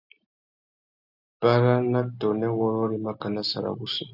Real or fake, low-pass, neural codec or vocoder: real; 5.4 kHz; none